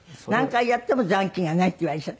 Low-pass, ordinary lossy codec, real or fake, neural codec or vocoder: none; none; real; none